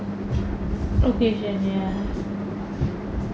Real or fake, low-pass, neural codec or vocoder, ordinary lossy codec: real; none; none; none